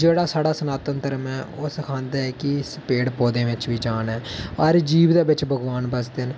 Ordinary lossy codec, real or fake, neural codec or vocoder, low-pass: none; real; none; none